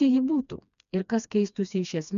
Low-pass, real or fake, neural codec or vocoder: 7.2 kHz; fake; codec, 16 kHz, 2 kbps, FreqCodec, smaller model